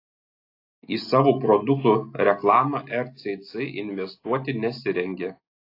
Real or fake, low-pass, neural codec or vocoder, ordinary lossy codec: real; 5.4 kHz; none; AAC, 32 kbps